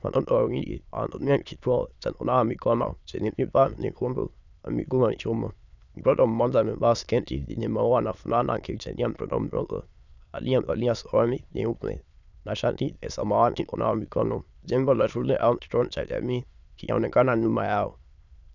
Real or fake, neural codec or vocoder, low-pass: fake; autoencoder, 22.05 kHz, a latent of 192 numbers a frame, VITS, trained on many speakers; 7.2 kHz